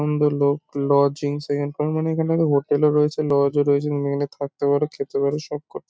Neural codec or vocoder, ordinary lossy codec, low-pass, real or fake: none; none; none; real